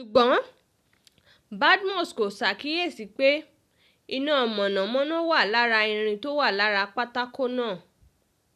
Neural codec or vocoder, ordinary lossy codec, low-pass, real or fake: none; none; 14.4 kHz; real